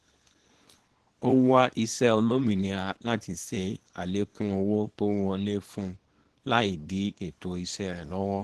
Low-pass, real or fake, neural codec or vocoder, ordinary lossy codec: 10.8 kHz; fake; codec, 24 kHz, 0.9 kbps, WavTokenizer, small release; Opus, 16 kbps